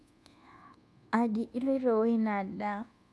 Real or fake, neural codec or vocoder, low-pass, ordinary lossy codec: fake; codec, 24 kHz, 1.2 kbps, DualCodec; none; none